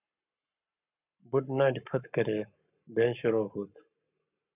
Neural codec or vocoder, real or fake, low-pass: codec, 44.1 kHz, 7.8 kbps, Pupu-Codec; fake; 3.6 kHz